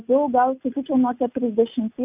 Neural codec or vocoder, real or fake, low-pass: none; real; 3.6 kHz